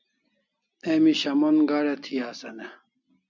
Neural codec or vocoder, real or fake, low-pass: none; real; 7.2 kHz